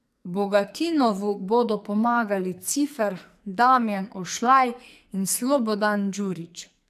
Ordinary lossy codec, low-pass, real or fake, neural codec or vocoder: AAC, 96 kbps; 14.4 kHz; fake; codec, 44.1 kHz, 2.6 kbps, SNAC